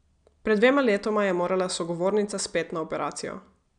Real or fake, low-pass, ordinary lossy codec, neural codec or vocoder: real; 9.9 kHz; none; none